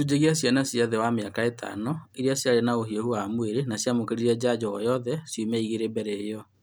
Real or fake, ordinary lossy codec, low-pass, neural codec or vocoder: real; none; none; none